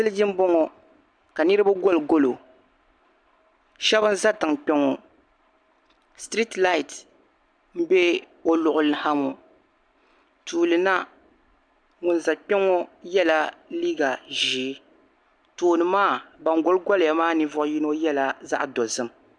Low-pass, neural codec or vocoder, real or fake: 9.9 kHz; none; real